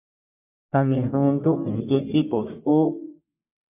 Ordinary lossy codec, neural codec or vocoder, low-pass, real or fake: AAC, 32 kbps; codec, 44.1 kHz, 1.7 kbps, Pupu-Codec; 3.6 kHz; fake